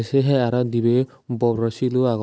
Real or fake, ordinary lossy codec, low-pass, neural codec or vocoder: real; none; none; none